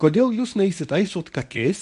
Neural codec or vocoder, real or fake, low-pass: codec, 24 kHz, 0.9 kbps, WavTokenizer, medium speech release version 1; fake; 10.8 kHz